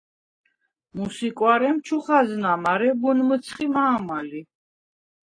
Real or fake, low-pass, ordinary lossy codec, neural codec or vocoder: real; 9.9 kHz; AAC, 32 kbps; none